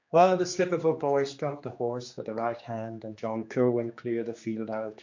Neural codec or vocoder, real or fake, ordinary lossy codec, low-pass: codec, 16 kHz, 2 kbps, X-Codec, HuBERT features, trained on general audio; fake; MP3, 48 kbps; 7.2 kHz